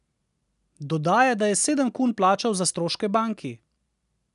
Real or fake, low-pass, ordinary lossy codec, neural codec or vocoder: real; 10.8 kHz; none; none